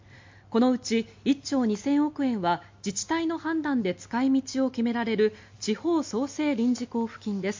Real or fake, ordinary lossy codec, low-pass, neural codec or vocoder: real; MP3, 48 kbps; 7.2 kHz; none